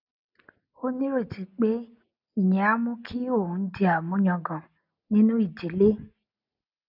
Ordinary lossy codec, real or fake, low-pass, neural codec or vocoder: none; real; 5.4 kHz; none